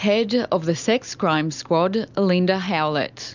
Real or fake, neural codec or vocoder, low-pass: real; none; 7.2 kHz